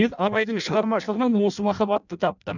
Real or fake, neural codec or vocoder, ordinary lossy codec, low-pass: fake; codec, 16 kHz in and 24 kHz out, 0.6 kbps, FireRedTTS-2 codec; none; 7.2 kHz